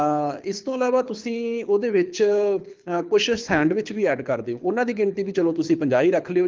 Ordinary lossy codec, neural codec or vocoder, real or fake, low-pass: Opus, 32 kbps; codec, 24 kHz, 6 kbps, HILCodec; fake; 7.2 kHz